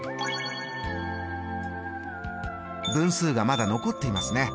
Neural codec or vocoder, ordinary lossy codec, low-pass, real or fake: none; none; none; real